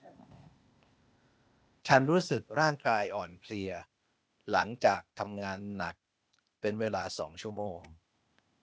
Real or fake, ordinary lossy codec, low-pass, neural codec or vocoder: fake; none; none; codec, 16 kHz, 0.8 kbps, ZipCodec